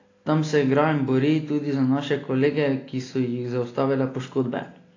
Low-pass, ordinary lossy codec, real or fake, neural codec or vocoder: 7.2 kHz; AAC, 32 kbps; real; none